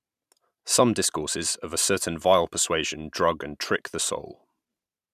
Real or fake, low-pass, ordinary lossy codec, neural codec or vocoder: fake; 14.4 kHz; none; vocoder, 44.1 kHz, 128 mel bands every 512 samples, BigVGAN v2